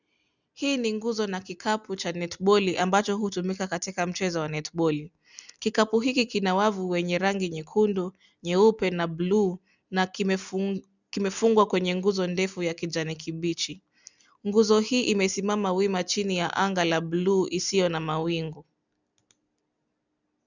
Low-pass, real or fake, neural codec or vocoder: 7.2 kHz; real; none